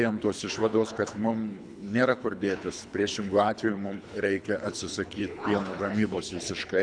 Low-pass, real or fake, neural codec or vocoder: 9.9 kHz; fake; codec, 24 kHz, 3 kbps, HILCodec